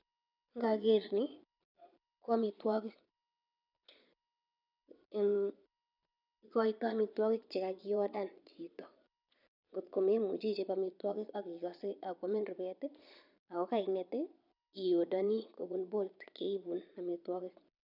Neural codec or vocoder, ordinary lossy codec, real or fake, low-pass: vocoder, 22.05 kHz, 80 mel bands, Vocos; none; fake; 5.4 kHz